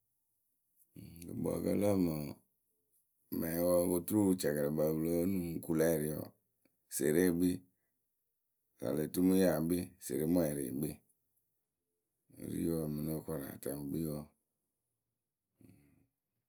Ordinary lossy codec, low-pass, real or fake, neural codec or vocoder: none; none; real; none